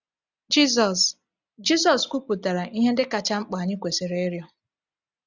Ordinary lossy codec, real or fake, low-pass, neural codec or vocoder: none; real; 7.2 kHz; none